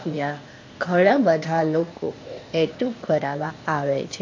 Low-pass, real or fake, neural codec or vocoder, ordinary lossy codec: 7.2 kHz; fake; codec, 16 kHz, 0.8 kbps, ZipCodec; MP3, 48 kbps